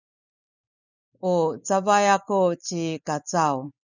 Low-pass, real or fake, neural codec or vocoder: 7.2 kHz; real; none